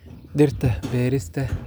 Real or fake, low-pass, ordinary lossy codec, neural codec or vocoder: real; none; none; none